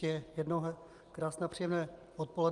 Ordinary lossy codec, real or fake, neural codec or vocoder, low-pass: Opus, 32 kbps; real; none; 10.8 kHz